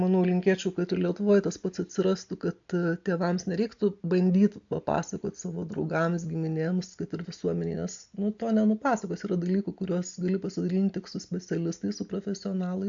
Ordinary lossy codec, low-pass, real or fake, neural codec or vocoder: AAC, 64 kbps; 7.2 kHz; real; none